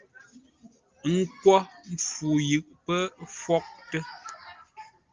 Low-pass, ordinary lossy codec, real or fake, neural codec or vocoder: 7.2 kHz; Opus, 24 kbps; real; none